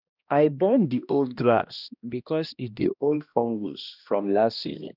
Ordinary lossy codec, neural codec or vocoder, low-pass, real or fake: none; codec, 16 kHz, 1 kbps, X-Codec, HuBERT features, trained on balanced general audio; 5.4 kHz; fake